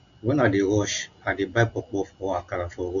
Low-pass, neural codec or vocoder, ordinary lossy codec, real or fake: 7.2 kHz; none; none; real